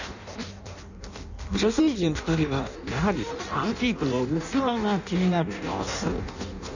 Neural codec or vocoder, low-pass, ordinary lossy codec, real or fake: codec, 16 kHz in and 24 kHz out, 0.6 kbps, FireRedTTS-2 codec; 7.2 kHz; none; fake